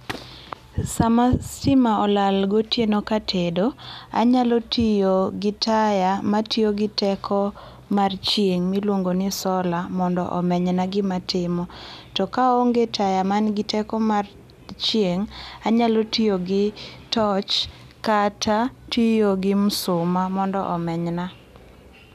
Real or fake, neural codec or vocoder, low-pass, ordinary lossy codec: real; none; 14.4 kHz; none